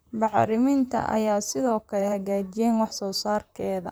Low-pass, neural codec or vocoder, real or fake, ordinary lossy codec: none; vocoder, 44.1 kHz, 128 mel bands, Pupu-Vocoder; fake; none